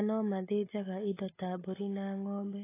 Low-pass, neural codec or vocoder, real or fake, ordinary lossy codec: 3.6 kHz; none; real; AAC, 16 kbps